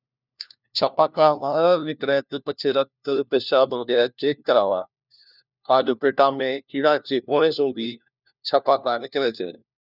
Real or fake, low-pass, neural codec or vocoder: fake; 5.4 kHz; codec, 16 kHz, 1 kbps, FunCodec, trained on LibriTTS, 50 frames a second